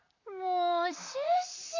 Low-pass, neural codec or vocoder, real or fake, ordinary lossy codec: 7.2 kHz; vocoder, 44.1 kHz, 128 mel bands, Pupu-Vocoder; fake; AAC, 48 kbps